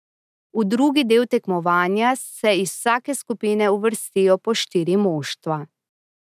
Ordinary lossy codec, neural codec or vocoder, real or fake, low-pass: none; none; real; 14.4 kHz